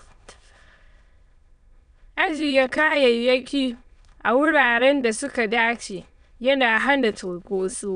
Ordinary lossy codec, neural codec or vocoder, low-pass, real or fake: none; autoencoder, 22.05 kHz, a latent of 192 numbers a frame, VITS, trained on many speakers; 9.9 kHz; fake